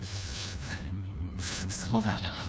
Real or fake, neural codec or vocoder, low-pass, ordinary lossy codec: fake; codec, 16 kHz, 1 kbps, FunCodec, trained on LibriTTS, 50 frames a second; none; none